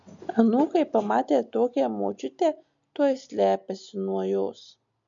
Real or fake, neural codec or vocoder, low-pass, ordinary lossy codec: real; none; 7.2 kHz; MP3, 64 kbps